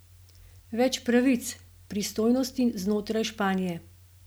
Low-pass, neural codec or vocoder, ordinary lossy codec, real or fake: none; none; none; real